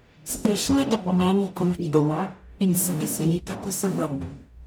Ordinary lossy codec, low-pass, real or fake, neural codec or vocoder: none; none; fake; codec, 44.1 kHz, 0.9 kbps, DAC